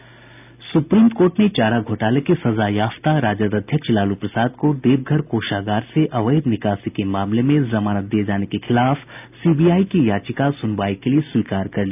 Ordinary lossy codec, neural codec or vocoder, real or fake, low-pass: none; none; real; 3.6 kHz